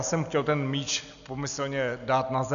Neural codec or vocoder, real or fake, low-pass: none; real; 7.2 kHz